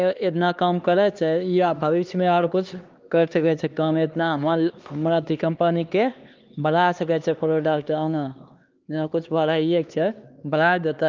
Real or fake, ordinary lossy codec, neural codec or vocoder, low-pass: fake; Opus, 24 kbps; codec, 16 kHz, 2 kbps, X-Codec, HuBERT features, trained on LibriSpeech; 7.2 kHz